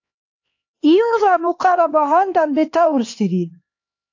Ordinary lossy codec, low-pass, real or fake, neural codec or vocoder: AAC, 48 kbps; 7.2 kHz; fake; codec, 16 kHz, 2 kbps, X-Codec, HuBERT features, trained on LibriSpeech